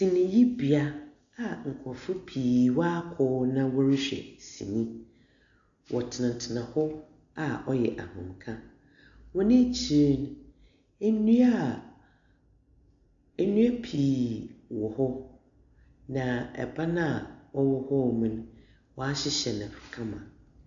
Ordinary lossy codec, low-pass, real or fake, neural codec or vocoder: AAC, 64 kbps; 7.2 kHz; real; none